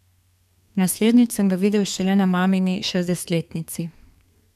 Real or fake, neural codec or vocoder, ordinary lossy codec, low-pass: fake; codec, 32 kHz, 1.9 kbps, SNAC; none; 14.4 kHz